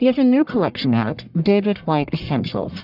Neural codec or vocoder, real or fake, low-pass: codec, 44.1 kHz, 1.7 kbps, Pupu-Codec; fake; 5.4 kHz